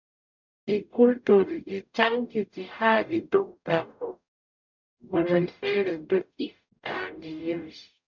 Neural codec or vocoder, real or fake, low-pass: codec, 44.1 kHz, 0.9 kbps, DAC; fake; 7.2 kHz